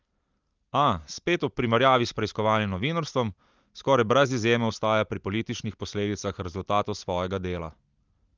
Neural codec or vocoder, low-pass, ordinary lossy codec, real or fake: none; 7.2 kHz; Opus, 32 kbps; real